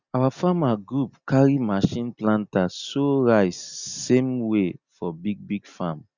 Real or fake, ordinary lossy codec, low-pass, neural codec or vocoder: real; Opus, 64 kbps; 7.2 kHz; none